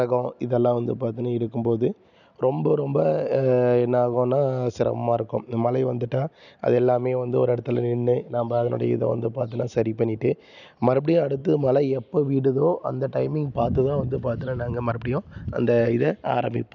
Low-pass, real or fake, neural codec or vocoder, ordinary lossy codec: 7.2 kHz; real; none; none